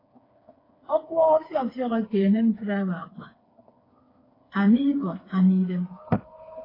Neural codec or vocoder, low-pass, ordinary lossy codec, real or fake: codec, 16 kHz, 4 kbps, FreqCodec, smaller model; 5.4 kHz; AAC, 24 kbps; fake